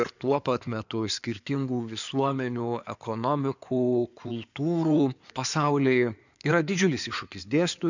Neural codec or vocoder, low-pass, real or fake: codec, 16 kHz in and 24 kHz out, 2.2 kbps, FireRedTTS-2 codec; 7.2 kHz; fake